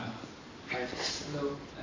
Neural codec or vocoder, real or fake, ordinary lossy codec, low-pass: none; real; MP3, 32 kbps; 7.2 kHz